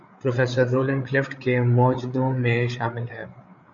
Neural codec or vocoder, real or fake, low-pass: codec, 16 kHz, 8 kbps, FreqCodec, larger model; fake; 7.2 kHz